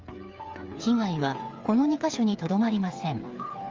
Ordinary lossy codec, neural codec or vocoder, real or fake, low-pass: Opus, 32 kbps; codec, 16 kHz, 8 kbps, FreqCodec, smaller model; fake; 7.2 kHz